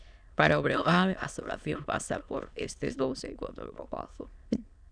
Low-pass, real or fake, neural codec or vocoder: 9.9 kHz; fake; autoencoder, 22.05 kHz, a latent of 192 numbers a frame, VITS, trained on many speakers